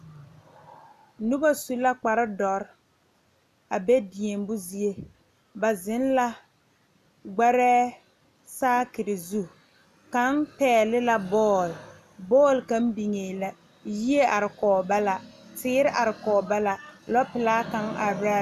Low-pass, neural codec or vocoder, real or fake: 14.4 kHz; none; real